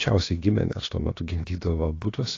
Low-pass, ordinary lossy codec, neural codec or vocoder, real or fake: 7.2 kHz; AAC, 32 kbps; codec, 16 kHz, about 1 kbps, DyCAST, with the encoder's durations; fake